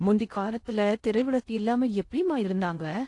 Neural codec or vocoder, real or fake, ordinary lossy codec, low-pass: codec, 16 kHz in and 24 kHz out, 0.6 kbps, FocalCodec, streaming, 2048 codes; fake; AAC, 48 kbps; 10.8 kHz